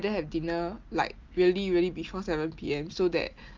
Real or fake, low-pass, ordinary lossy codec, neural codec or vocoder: real; 7.2 kHz; Opus, 24 kbps; none